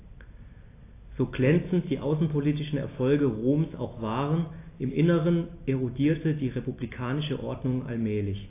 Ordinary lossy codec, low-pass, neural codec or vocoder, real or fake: AAC, 24 kbps; 3.6 kHz; none; real